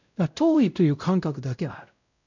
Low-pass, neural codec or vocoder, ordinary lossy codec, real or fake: 7.2 kHz; codec, 16 kHz, 0.5 kbps, X-Codec, WavLM features, trained on Multilingual LibriSpeech; none; fake